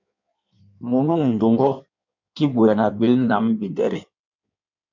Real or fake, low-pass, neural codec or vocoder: fake; 7.2 kHz; codec, 16 kHz in and 24 kHz out, 1.1 kbps, FireRedTTS-2 codec